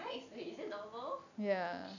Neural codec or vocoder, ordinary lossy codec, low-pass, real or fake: none; none; 7.2 kHz; real